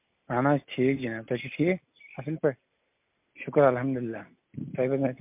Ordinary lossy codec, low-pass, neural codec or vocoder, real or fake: MP3, 32 kbps; 3.6 kHz; none; real